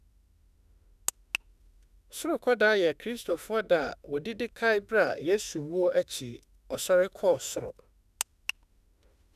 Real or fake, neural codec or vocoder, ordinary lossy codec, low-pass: fake; autoencoder, 48 kHz, 32 numbers a frame, DAC-VAE, trained on Japanese speech; none; 14.4 kHz